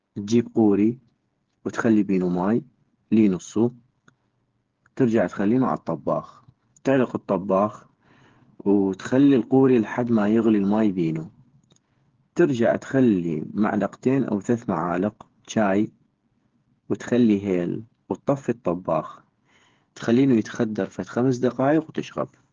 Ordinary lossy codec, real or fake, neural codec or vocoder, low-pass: Opus, 16 kbps; fake; codec, 16 kHz, 8 kbps, FreqCodec, smaller model; 7.2 kHz